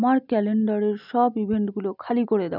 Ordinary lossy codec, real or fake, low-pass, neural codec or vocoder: none; real; 5.4 kHz; none